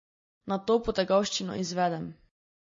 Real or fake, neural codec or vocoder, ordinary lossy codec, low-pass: real; none; MP3, 32 kbps; 7.2 kHz